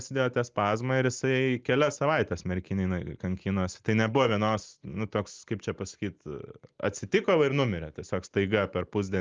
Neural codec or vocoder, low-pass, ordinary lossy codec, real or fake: none; 7.2 kHz; Opus, 32 kbps; real